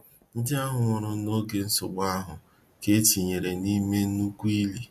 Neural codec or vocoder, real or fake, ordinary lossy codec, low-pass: none; real; MP3, 96 kbps; 14.4 kHz